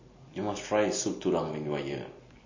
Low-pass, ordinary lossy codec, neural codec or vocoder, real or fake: 7.2 kHz; MP3, 32 kbps; none; real